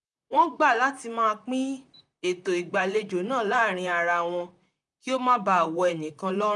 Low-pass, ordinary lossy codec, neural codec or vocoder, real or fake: 10.8 kHz; none; vocoder, 44.1 kHz, 128 mel bands, Pupu-Vocoder; fake